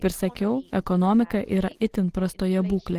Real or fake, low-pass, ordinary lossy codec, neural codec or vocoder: real; 14.4 kHz; Opus, 16 kbps; none